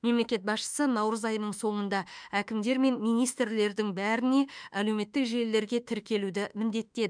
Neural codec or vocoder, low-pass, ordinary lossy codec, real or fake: autoencoder, 48 kHz, 32 numbers a frame, DAC-VAE, trained on Japanese speech; 9.9 kHz; none; fake